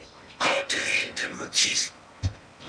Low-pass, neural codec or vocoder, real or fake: 9.9 kHz; codec, 16 kHz in and 24 kHz out, 0.8 kbps, FocalCodec, streaming, 65536 codes; fake